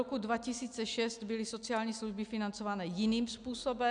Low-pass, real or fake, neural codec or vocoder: 9.9 kHz; real; none